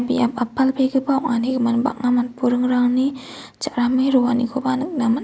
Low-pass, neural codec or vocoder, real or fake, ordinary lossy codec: none; none; real; none